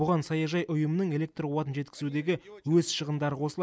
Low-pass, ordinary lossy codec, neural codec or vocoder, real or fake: none; none; none; real